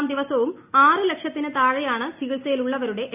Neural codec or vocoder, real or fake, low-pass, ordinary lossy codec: none; real; 3.6 kHz; none